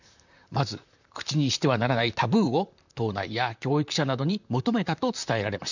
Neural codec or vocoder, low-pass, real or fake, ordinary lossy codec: vocoder, 44.1 kHz, 128 mel bands, Pupu-Vocoder; 7.2 kHz; fake; none